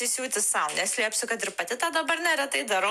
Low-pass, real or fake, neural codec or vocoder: 14.4 kHz; real; none